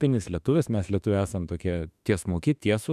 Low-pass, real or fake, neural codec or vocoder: 14.4 kHz; fake; autoencoder, 48 kHz, 32 numbers a frame, DAC-VAE, trained on Japanese speech